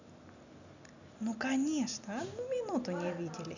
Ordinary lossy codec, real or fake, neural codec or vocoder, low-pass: none; real; none; 7.2 kHz